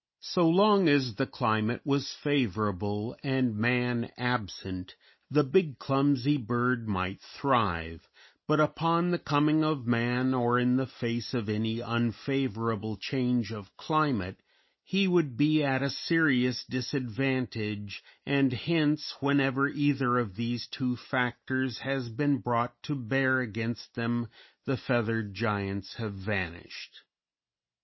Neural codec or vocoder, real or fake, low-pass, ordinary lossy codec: none; real; 7.2 kHz; MP3, 24 kbps